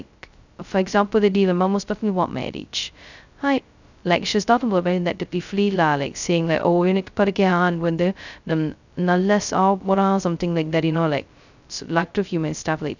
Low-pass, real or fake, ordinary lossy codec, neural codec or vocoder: 7.2 kHz; fake; none; codec, 16 kHz, 0.2 kbps, FocalCodec